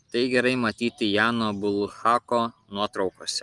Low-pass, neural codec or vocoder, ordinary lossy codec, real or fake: 10.8 kHz; none; Opus, 32 kbps; real